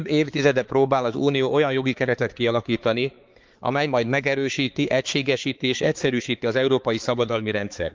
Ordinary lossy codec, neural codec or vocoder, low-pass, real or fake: Opus, 32 kbps; codec, 16 kHz, 4 kbps, X-Codec, HuBERT features, trained on balanced general audio; 7.2 kHz; fake